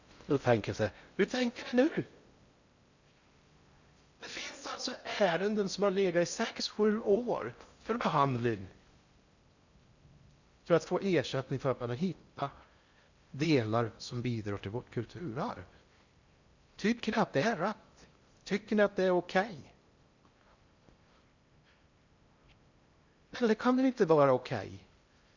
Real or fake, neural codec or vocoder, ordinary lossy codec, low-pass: fake; codec, 16 kHz in and 24 kHz out, 0.6 kbps, FocalCodec, streaming, 4096 codes; Opus, 64 kbps; 7.2 kHz